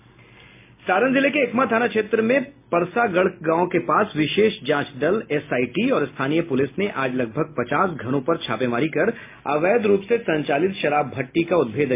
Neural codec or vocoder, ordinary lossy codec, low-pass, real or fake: none; MP3, 24 kbps; 3.6 kHz; real